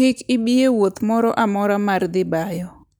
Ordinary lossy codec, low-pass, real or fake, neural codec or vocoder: none; none; real; none